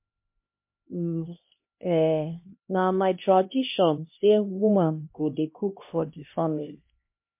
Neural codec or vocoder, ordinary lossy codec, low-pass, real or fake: codec, 16 kHz, 1 kbps, X-Codec, HuBERT features, trained on LibriSpeech; MP3, 32 kbps; 3.6 kHz; fake